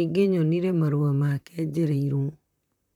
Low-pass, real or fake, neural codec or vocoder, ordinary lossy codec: 19.8 kHz; fake; vocoder, 44.1 kHz, 128 mel bands, Pupu-Vocoder; none